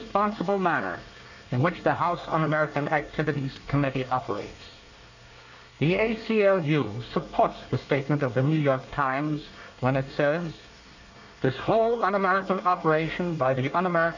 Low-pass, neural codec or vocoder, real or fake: 7.2 kHz; codec, 24 kHz, 1 kbps, SNAC; fake